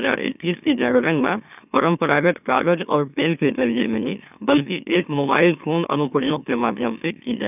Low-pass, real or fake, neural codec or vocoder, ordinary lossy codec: 3.6 kHz; fake; autoencoder, 44.1 kHz, a latent of 192 numbers a frame, MeloTTS; none